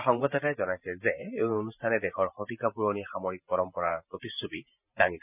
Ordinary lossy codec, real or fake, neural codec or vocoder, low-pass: none; fake; vocoder, 44.1 kHz, 128 mel bands every 512 samples, BigVGAN v2; 3.6 kHz